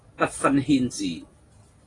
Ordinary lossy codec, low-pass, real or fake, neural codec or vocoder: AAC, 32 kbps; 10.8 kHz; real; none